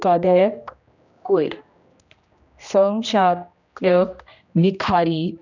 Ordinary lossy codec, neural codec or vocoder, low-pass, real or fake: none; codec, 16 kHz, 1 kbps, X-Codec, HuBERT features, trained on general audio; 7.2 kHz; fake